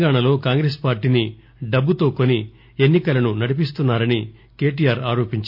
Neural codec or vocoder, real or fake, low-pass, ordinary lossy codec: none; real; 5.4 kHz; none